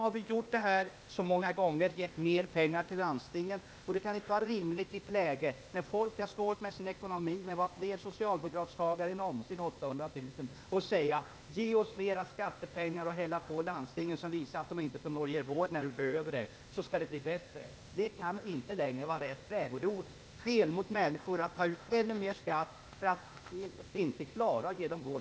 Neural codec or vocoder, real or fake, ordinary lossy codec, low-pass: codec, 16 kHz, 0.8 kbps, ZipCodec; fake; none; none